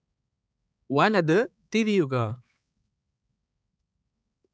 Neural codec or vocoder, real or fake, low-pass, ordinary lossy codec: codec, 16 kHz, 4 kbps, X-Codec, HuBERT features, trained on balanced general audio; fake; none; none